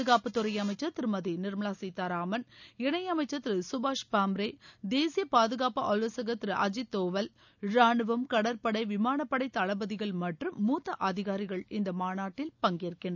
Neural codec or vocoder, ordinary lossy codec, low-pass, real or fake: none; none; 7.2 kHz; real